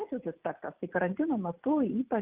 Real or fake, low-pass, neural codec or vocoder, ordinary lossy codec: real; 3.6 kHz; none; Opus, 32 kbps